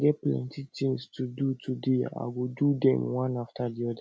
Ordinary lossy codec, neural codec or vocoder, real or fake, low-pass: none; none; real; none